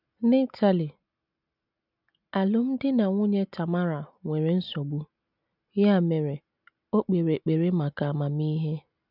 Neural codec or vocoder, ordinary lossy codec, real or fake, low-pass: none; none; real; 5.4 kHz